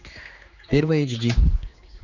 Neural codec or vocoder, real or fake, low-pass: codec, 16 kHz, 2 kbps, X-Codec, HuBERT features, trained on general audio; fake; 7.2 kHz